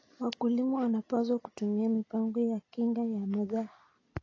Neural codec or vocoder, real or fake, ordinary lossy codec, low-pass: none; real; AAC, 32 kbps; 7.2 kHz